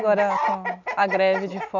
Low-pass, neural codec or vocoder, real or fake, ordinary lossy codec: 7.2 kHz; none; real; none